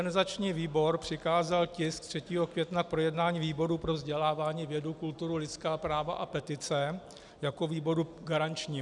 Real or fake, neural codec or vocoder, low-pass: real; none; 10.8 kHz